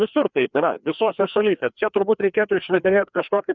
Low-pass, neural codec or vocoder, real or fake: 7.2 kHz; codec, 16 kHz, 2 kbps, FreqCodec, larger model; fake